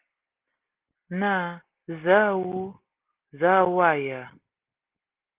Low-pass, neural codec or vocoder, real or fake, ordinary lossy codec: 3.6 kHz; none; real; Opus, 16 kbps